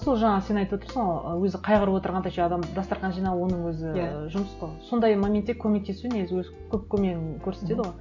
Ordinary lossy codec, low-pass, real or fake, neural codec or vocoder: none; 7.2 kHz; real; none